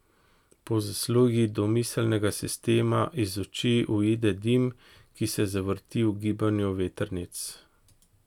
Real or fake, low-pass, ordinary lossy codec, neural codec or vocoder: real; 19.8 kHz; none; none